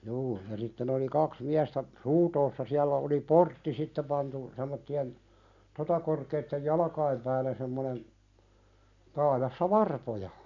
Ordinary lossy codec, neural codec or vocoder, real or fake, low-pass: none; none; real; 7.2 kHz